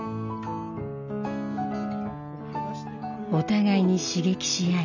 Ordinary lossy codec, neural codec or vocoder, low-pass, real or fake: none; none; 7.2 kHz; real